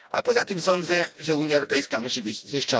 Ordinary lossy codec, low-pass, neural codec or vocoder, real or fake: none; none; codec, 16 kHz, 1 kbps, FreqCodec, smaller model; fake